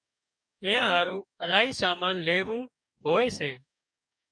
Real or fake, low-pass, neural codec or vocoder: fake; 9.9 kHz; codec, 44.1 kHz, 2.6 kbps, DAC